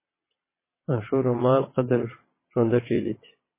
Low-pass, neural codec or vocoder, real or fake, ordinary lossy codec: 3.6 kHz; vocoder, 22.05 kHz, 80 mel bands, WaveNeXt; fake; MP3, 16 kbps